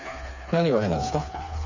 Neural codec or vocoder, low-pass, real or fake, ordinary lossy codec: codec, 16 kHz, 4 kbps, FreqCodec, smaller model; 7.2 kHz; fake; none